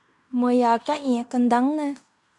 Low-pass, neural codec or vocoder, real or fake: 10.8 kHz; codec, 16 kHz in and 24 kHz out, 0.9 kbps, LongCat-Audio-Codec, fine tuned four codebook decoder; fake